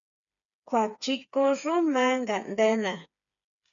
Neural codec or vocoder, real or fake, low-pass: codec, 16 kHz, 4 kbps, FreqCodec, smaller model; fake; 7.2 kHz